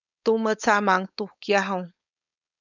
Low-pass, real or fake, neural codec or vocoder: 7.2 kHz; fake; codec, 16 kHz, 4.8 kbps, FACodec